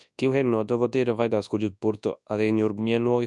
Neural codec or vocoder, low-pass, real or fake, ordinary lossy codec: codec, 24 kHz, 0.9 kbps, WavTokenizer, large speech release; 10.8 kHz; fake; none